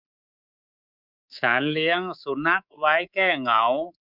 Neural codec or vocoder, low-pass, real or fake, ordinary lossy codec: codec, 24 kHz, 3.1 kbps, DualCodec; 5.4 kHz; fake; none